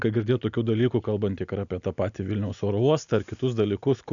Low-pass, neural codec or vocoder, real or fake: 7.2 kHz; none; real